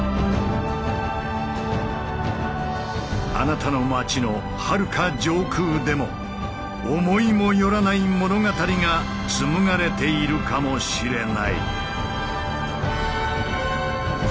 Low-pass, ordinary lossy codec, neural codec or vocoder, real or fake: none; none; none; real